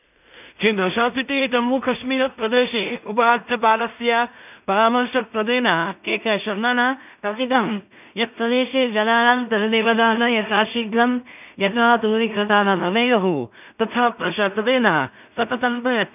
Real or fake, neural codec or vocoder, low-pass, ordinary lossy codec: fake; codec, 16 kHz in and 24 kHz out, 0.4 kbps, LongCat-Audio-Codec, two codebook decoder; 3.6 kHz; none